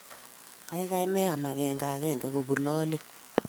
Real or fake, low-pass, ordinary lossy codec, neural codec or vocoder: fake; none; none; codec, 44.1 kHz, 2.6 kbps, SNAC